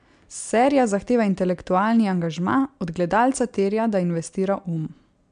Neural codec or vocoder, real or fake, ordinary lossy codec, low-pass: none; real; MP3, 64 kbps; 9.9 kHz